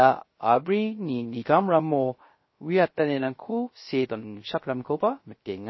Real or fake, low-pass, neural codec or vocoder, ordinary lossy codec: fake; 7.2 kHz; codec, 16 kHz, 0.3 kbps, FocalCodec; MP3, 24 kbps